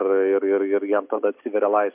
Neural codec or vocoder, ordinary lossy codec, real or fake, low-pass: none; MP3, 32 kbps; real; 3.6 kHz